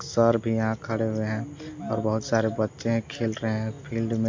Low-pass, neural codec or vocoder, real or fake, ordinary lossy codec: 7.2 kHz; none; real; MP3, 48 kbps